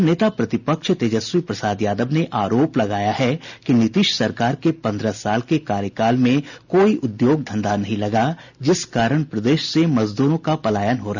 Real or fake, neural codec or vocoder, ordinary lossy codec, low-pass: real; none; none; 7.2 kHz